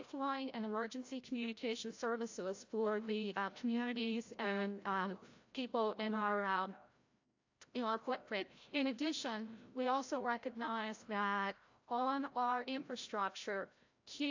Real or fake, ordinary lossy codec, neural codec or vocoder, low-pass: fake; AAC, 48 kbps; codec, 16 kHz, 0.5 kbps, FreqCodec, larger model; 7.2 kHz